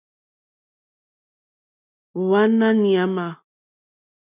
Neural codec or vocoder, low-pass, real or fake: none; 3.6 kHz; real